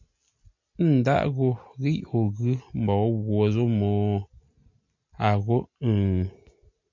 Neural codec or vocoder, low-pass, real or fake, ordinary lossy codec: none; 7.2 kHz; real; MP3, 48 kbps